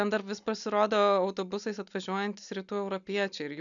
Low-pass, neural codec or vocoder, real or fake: 7.2 kHz; none; real